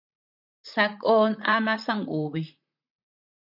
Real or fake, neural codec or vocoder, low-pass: fake; vocoder, 44.1 kHz, 128 mel bands, Pupu-Vocoder; 5.4 kHz